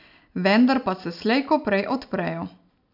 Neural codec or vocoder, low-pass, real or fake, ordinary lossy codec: none; 5.4 kHz; real; none